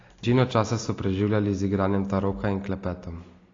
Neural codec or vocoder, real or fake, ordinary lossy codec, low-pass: none; real; AAC, 32 kbps; 7.2 kHz